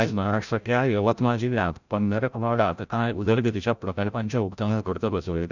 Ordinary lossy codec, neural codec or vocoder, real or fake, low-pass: none; codec, 16 kHz, 0.5 kbps, FreqCodec, larger model; fake; 7.2 kHz